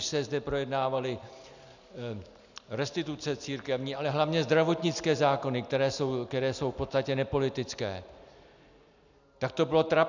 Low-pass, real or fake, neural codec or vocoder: 7.2 kHz; real; none